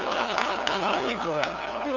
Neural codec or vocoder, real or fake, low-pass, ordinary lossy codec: codec, 16 kHz, 2 kbps, FunCodec, trained on LibriTTS, 25 frames a second; fake; 7.2 kHz; none